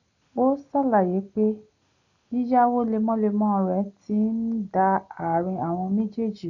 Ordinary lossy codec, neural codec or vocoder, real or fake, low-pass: none; none; real; 7.2 kHz